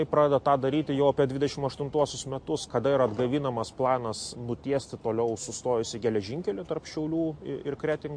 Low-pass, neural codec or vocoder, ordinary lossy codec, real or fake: 9.9 kHz; none; MP3, 48 kbps; real